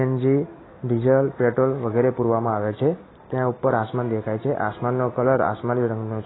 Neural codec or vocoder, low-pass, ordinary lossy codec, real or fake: none; 7.2 kHz; AAC, 16 kbps; real